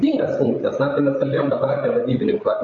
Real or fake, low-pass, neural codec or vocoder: fake; 7.2 kHz; codec, 16 kHz, 8 kbps, FreqCodec, larger model